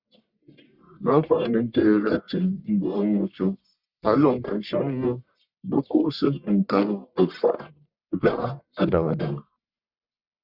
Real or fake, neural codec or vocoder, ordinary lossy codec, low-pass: fake; codec, 44.1 kHz, 1.7 kbps, Pupu-Codec; Opus, 64 kbps; 5.4 kHz